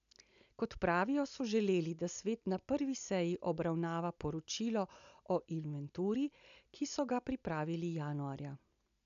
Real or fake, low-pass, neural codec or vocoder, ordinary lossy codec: real; 7.2 kHz; none; none